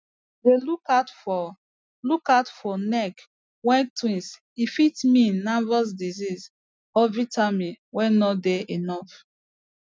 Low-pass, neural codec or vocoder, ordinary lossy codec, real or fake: none; none; none; real